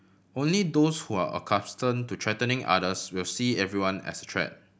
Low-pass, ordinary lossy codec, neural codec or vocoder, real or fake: none; none; none; real